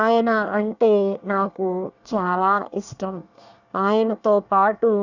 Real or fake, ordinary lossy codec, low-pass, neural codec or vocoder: fake; none; 7.2 kHz; codec, 24 kHz, 1 kbps, SNAC